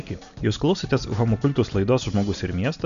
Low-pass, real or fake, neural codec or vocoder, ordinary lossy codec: 7.2 kHz; real; none; MP3, 96 kbps